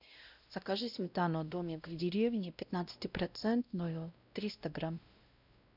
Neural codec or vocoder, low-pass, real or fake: codec, 16 kHz, 0.5 kbps, X-Codec, WavLM features, trained on Multilingual LibriSpeech; 5.4 kHz; fake